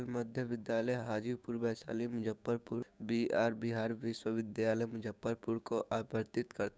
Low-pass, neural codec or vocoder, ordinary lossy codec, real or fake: none; codec, 16 kHz, 6 kbps, DAC; none; fake